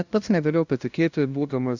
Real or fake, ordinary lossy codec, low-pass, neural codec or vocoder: fake; Opus, 64 kbps; 7.2 kHz; codec, 16 kHz, 0.5 kbps, FunCodec, trained on LibriTTS, 25 frames a second